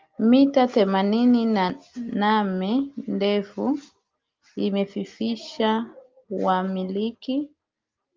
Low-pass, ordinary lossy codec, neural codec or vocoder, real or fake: 7.2 kHz; Opus, 32 kbps; none; real